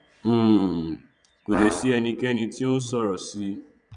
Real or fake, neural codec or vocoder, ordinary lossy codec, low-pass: fake; vocoder, 22.05 kHz, 80 mel bands, Vocos; none; 9.9 kHz